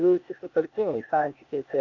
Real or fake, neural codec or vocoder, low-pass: fake; codec, 16 kHz, 0.8 kbps, ZipCodec; 7.2 kHz